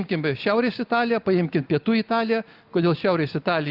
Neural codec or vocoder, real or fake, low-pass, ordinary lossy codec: none; real; 5.4 kHz; Opus, 32 kbps